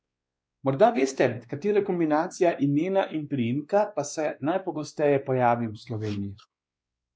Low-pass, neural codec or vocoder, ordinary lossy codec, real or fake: none; codec, 16 kHz, 2 kbps, X-Codec, WavLM features, trained on Multilingual LibriSpeech; none; fake